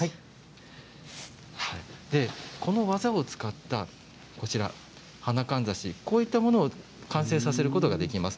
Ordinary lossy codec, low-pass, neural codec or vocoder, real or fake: none; none; none; real